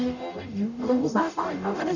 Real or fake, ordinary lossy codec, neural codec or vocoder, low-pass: fake; none; codec, 44.1 kHz, 0.9 kbps, DAC; 7.2 kHz